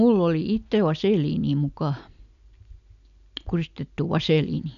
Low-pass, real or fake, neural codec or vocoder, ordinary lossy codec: 7.2 kHz; real; none; none